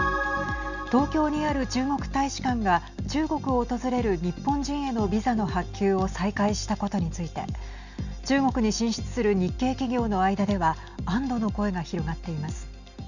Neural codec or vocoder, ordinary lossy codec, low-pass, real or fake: none; none; 7.2 kHz; real